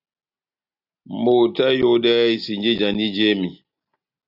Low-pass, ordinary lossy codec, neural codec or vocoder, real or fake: 5.4 kHz; AAC, 48 kbps; none; real